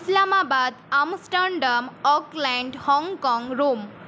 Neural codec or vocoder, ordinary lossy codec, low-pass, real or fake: none; none; none; real